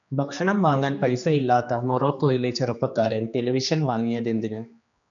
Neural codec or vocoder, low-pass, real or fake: codec, 16 kHz, 2 kbps, X-Codec, HuBERT features, trained on general audio; 7.2 kHz; fake